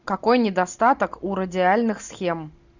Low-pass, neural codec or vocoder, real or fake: 7.2 kHz; none; real